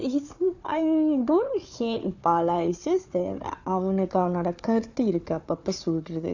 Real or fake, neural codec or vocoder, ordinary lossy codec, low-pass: fake; codec, 16 kHz, 4 kbps, FunCodec, trained on Chinese and English, 50 frames a second; none; 7.2 kHz